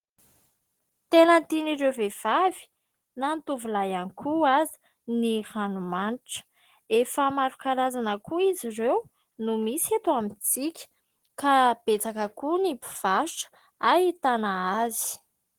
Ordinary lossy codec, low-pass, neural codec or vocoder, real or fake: Opus, 16 kbps; 19.8 kHz; none; real